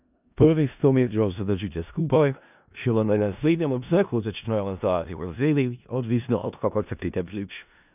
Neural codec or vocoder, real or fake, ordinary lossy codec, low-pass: codec, 16 kHz in and 24 kHz out, 0.4 kbps, LongCat-Audio-Codec, four codebook decoder; fake; none; 3.6 kHz